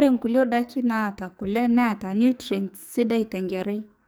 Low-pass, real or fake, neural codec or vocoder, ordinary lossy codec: none; fake; codec, 44.1 kHz, 2.6 kbps, SNAC; none